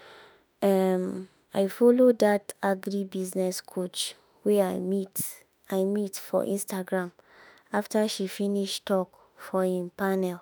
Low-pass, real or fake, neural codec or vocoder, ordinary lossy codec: none; fake; autoencoder, 48 kHz, 32 numbers a frame, DAC-VAE, trained on Japanese speech; none